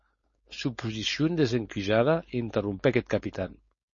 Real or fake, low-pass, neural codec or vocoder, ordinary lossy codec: fake; 7.2 kHz; codec, 16 kHz, 4.8 kbps, FACodec; MP3, 32 kbps